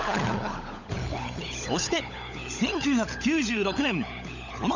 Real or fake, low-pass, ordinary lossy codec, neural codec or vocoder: fake; 7.2 kHz; none; codec, 16 kHz, 16 kbps, FunCodec, trained on LibriTTS, 50 frames a second